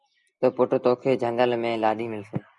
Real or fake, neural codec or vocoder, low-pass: real; none; 10.8 kHz